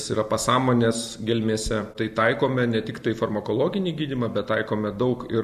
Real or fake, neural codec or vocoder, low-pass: real; none; 14.4 kHz